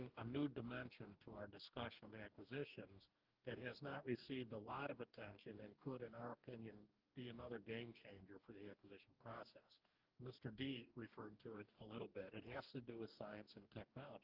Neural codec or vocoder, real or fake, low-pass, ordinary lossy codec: codec, 44.1 kHz, 2.6 kbps, DAC; fake; 5.4 kHz; Opus, 24 kbps